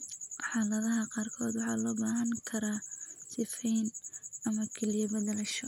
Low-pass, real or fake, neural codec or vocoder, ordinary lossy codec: 19.8 kHz; real; none; none